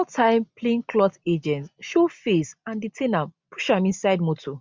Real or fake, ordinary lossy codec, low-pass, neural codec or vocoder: real; none; none; none